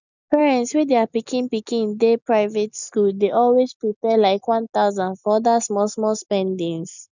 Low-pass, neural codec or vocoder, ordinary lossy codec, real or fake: 7.2 kHz; none; none; real